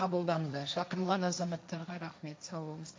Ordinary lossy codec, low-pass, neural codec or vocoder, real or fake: none; none; codec, 16 kHz, 1.1 kbps, Voila-Tokenizer; fake